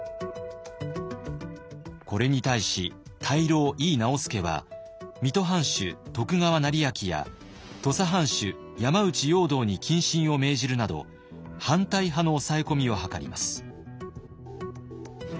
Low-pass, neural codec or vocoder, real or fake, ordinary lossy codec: none; none; real; none